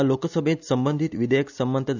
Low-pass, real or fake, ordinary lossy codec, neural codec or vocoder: 7.2 kHz; real; none; none